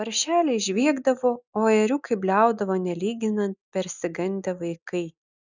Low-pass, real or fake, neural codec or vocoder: 7.2 kHz; real; none